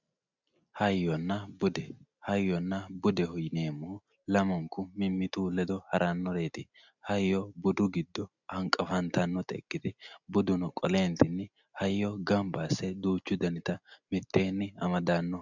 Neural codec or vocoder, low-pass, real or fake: none; 7.2 kHz; real